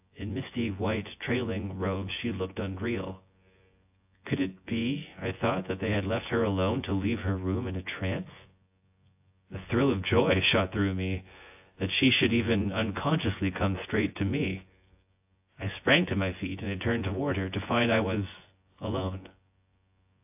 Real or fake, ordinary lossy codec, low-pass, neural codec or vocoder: fake; AAC, 32 kbps; 3.6 kHz; vocoder, 24 kHz, 100 mel bands, Vocos